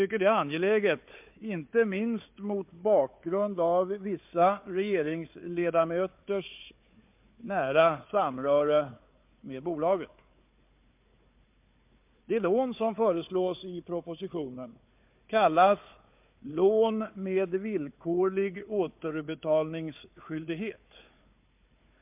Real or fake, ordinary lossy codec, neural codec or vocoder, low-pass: fake; MP3, 32 kbps; codec, 16 kHz, 4 kbps, FunCodec, trained on Chinese and English, 50 frames a second; 3.6 kHz